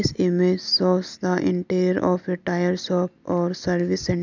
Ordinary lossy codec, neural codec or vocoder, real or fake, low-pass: none; none; real; 7.2 kHz